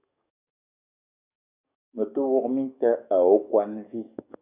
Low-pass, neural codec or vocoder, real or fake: 3.6 kHz; codec, 44.1 kHz, 7.8 kbps, DAC; fake